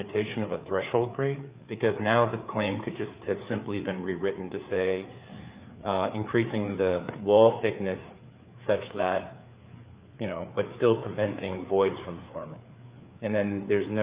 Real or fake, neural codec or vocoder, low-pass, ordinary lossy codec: fake; codec, 16 kHz, 4 kbps, FreqCodec, larger model; 3.6 kHz; Opus, 64 kbps